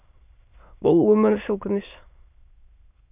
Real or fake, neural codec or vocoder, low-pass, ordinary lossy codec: fake; autoencoder, 22.05 kHz, a latent of 192 numbers a frame, VITS, trained on many speakers; 3.6 kHz; none